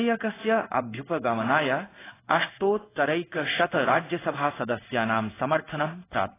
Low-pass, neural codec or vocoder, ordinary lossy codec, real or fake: 3.6 kHz; codec, 16 kHz in and 24 kHz out, 1 kbps, XY-Tokenizer; AAC, 16 kbps; fake